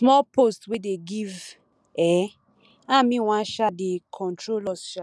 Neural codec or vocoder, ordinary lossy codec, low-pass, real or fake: none; none; none; real